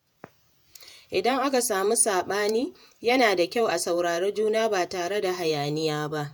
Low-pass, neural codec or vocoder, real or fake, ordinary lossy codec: none; vocoder, 48 kHz, 128 mel bands, Vocos; fake; none